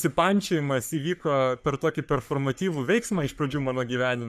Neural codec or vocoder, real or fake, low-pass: codec, 44.1 kHz, 3.4 kbps, Pupu-Codec; fake; 14.4 kHz